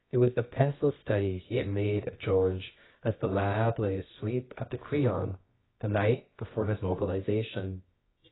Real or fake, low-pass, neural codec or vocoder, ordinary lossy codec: fake; 7.2 kHz; codec, 24 kHz, 0.9 kbps, WavTokenizer, medium music audio release; AAC, 16 kbps